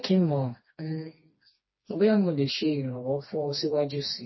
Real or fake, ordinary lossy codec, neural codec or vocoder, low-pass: fake; MP3, 24 kbps; codec, 16 kHz, 2 kbps, FreqCodec, smaller model; 7.2 kHz